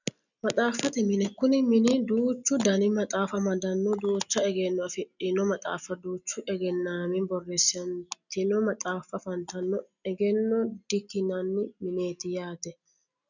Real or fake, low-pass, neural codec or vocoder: real; 7.2 kHz; none